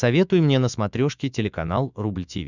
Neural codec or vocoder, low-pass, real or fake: autoencoder, 48 kHz, 128 numbers a frame, DAC-VAE, trained on Japanese speech; 7.2 kHz; fake